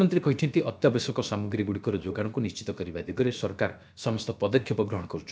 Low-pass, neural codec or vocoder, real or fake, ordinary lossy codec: none; codec, 16 kHz, about 1 kbps, DyCAST, with the encoder's durations; fake; none